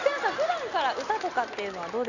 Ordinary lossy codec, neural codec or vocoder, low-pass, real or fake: none; none; 7.2 kHz; real